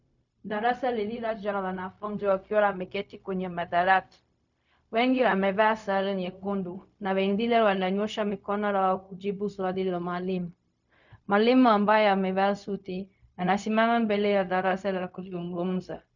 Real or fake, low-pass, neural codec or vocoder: fake; 7.2 kHz; codec, 16 kHz, 0.4 kbps, LongCat-Audio-Codec